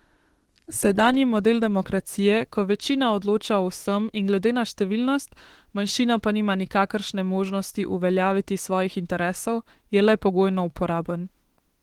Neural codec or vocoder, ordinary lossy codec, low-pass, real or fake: autoencoder, 48 kHz, 32 numbers a frame, DAC-VAE, trained on Japanese speech; Opus, 16 kbps; 19.8 kHz; fake